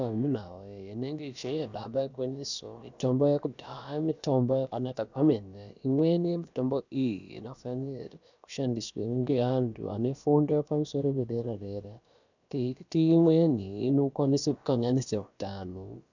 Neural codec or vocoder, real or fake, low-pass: codec, 16 kHz, about 1 kbps, DyCAST, with the encoder's durations; fake; 7.2 kHz